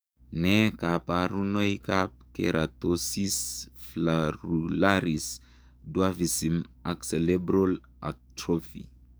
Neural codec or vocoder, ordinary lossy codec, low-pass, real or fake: codec, 44.1 kHz, 7.8 kbps, DAC; none; none; fake